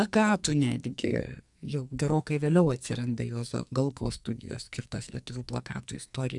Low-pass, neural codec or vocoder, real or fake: 10.8 kHz; codec, 44.1 kHz, 2.6 kbps, SNAC; fake